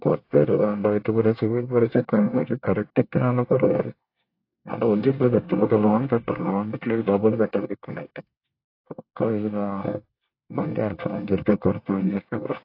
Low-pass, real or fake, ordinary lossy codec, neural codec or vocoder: 5.4 kHz; fake; AAC, 32 kbps; codec, 24 kHz, 1 kbps, SNAC